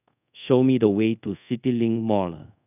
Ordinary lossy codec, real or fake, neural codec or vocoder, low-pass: none; fake; codec, 24 kHz, 0.5 kbps, DualCodec; 3.6 kHz